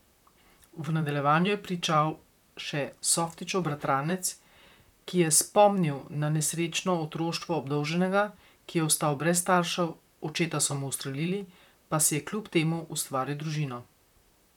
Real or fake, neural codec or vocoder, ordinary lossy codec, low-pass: fake; vocoder, 44.1 kHz, 128 mel bands, Pupu-Vocoder; none; 19.8 kHz